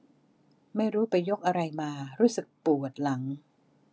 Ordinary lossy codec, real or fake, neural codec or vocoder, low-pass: none; real; none; none